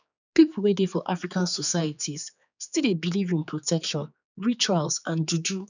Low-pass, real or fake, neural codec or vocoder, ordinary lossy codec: 7.2 kHz; fake; codec, 16 kHz, 4 kbps, X-Codec, HuBERT features, trained on general audio; none